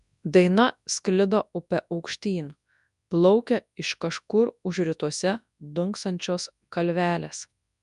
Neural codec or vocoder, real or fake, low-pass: codec, 24 kHz, 0.9 kbps, WavTokenizer, large speech release; fake; 10.8 kHz